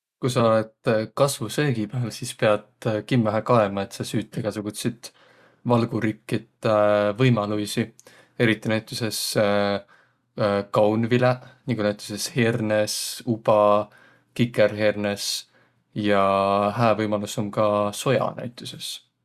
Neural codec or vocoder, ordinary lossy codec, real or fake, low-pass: none; Opus, 64 kbps; real; 14.4 kHz